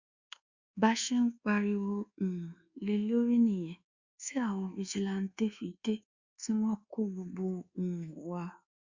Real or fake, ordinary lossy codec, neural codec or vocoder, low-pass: fake; Opus, 64 kbps; codec, 24 kHz, 1.2 kbps, DualCodec; 7.2 kHz